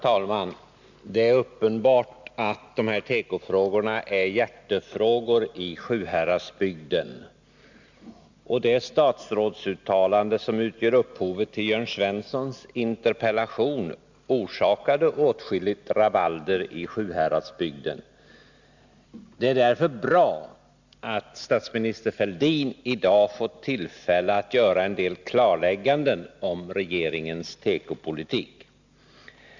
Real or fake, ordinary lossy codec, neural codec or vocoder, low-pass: real; Opus, 64 kbps; none; 7.2 kHz